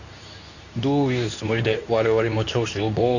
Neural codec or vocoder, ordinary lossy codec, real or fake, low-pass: codec, 24 kHz, 0.9 kbps, WavTokenizer, medium speech release version 2; none; fake; 7.2 kHz